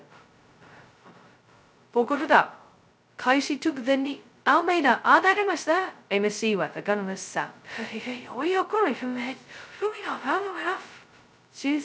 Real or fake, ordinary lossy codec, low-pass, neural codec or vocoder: fake; none; none; codec, 16 kHz, 0.2 kbps, FocalCodec